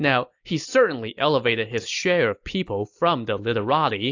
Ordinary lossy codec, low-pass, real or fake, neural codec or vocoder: AAC, 48 kbps; 7.2 kHz; real; none